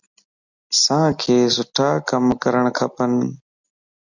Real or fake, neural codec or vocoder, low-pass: real; none; 7.2 kHz